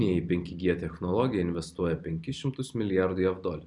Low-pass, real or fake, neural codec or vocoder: 10.8 kHz; fake; vocoder, 44.1 kHz, 128 mel bands every 256 samples, BigVGAN v2